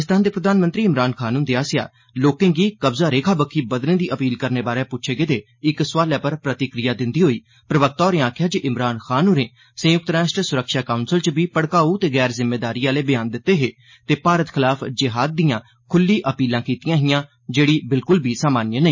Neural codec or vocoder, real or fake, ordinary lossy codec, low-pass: none; real; MP3, 32 kbps; 7.2 kHz